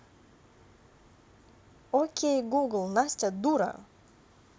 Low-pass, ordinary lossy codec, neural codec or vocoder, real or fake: none; none; none; real